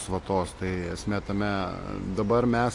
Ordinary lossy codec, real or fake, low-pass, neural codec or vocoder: AAC, 48 kbps; real; 10.8 kHz; none